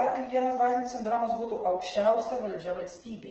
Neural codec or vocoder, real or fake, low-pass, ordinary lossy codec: codec, 16 kHz, 4 kbps, FreqCodec, smaller model; fake; 7.2 kHz; Opus, 16 kbps